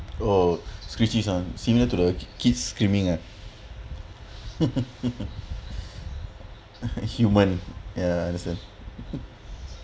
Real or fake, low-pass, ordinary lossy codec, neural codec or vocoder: real; none; none; none